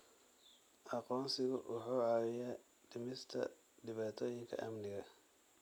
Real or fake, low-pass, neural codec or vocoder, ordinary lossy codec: real; none; none; none